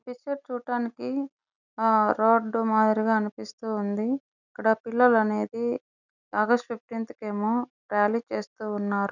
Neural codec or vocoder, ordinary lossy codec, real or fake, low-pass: none; none; real; 7.2 kHz